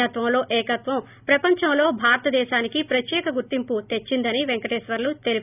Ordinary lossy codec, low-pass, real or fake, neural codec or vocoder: none; 3.6 kHz; real; none